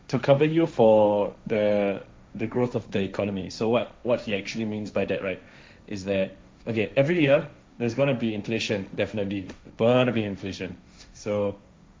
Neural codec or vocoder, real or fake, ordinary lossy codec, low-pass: codec, 16 kHz, 1.1 kbps, Voila-Tokenizer; fake; none; none